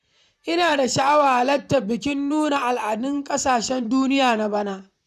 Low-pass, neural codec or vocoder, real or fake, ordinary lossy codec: 14.4 kHz; vocoder, 44.1 kHz, 128 mel bands, Pupu-Vocoder; fake; none